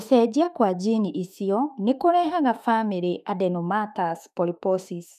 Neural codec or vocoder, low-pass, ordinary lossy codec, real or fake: autoencoder, 48 kHz, 32 numbers a frame, DAC-VAE, trained on Japanese speech; 14.4 kHz; none; fake